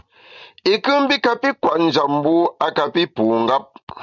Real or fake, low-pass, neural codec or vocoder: real; 7.2 kHz; none